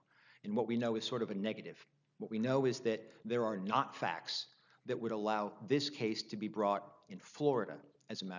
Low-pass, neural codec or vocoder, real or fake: 7.2 kHz; none; real